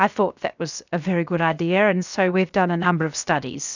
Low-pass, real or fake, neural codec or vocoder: 7.2 kHz; fake; codec, 16 kHz, 0.7 kbps, FocalCodec